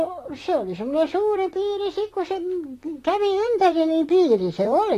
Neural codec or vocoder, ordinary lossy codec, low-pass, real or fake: vocoder, 44.1 kHz, 128 mel bands, Pupu-Vocoder; AAC, 48 kbps; 14.4 kHz; fake